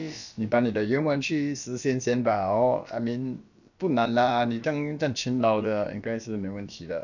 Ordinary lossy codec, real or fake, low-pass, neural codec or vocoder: none; fake; 7.2 kHz; codec, 16 kHz, about 1 kbps, DyCAST, with the encoder's durations